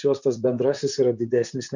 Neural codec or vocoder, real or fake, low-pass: vocoder, 44.1 kHz, 128 mel bands, Pupu-Vocoder; fake; 7.2 kHz